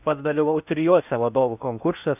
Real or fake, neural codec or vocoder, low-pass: fake; codec, 16 kHz in and 24 kHz out, 0.6 kbps, FocalCodec, streaming, 4096 codes; 3.6 kHz